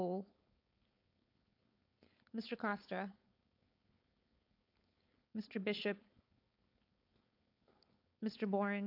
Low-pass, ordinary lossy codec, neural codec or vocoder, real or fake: 5.4 kHz; AAC, 32 kbps; codec, 16 kHz, 4.8 kbps, FACodec; fake